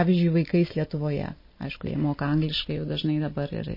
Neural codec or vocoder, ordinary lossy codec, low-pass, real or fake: none; MP3, 24 kbps; 5.4 kHz; real